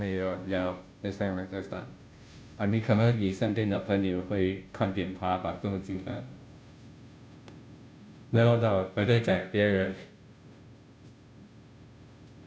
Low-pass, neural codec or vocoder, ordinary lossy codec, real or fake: none; codec, 16 kHz, 0.5 kbps, FunCodec, trained on Chinese and English, 25 frames a second; none; fake